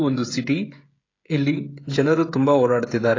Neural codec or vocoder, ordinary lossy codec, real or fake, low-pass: codec, 16 kHz, 16 kbps, FreqCodec, smaller model; AAC, 32 kbps; fake; 7.2 kHz